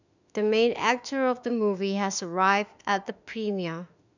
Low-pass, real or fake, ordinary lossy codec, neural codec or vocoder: 7.2 kHz; fake; none; autoencoder, 48 kHz, 32 numbers a frame, DAC-VAE, trained on Japanese speech